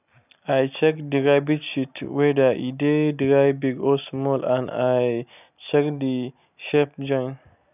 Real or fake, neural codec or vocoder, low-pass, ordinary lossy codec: real; none; 3.6 kHz; none